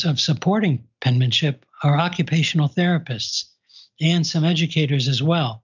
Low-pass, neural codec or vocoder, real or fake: 7.2 kHz; none; real